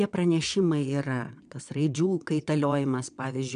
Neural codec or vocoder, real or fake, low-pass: vocoder, 22.05 kHz, 80 mel bands, WaveNeXt; fake; 9.9 kHz